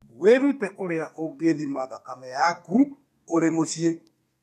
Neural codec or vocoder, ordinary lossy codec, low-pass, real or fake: codec, 32 kHz, 1.9 kbps, SNAC; none; 14.4 kHz; fake